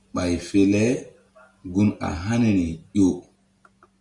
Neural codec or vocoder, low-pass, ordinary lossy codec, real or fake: none; 10.8 kHz; Opus, 64 kbps; real